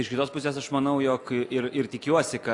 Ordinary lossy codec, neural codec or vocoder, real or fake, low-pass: AAC, 48 kbps; none; real; 10.8 kHz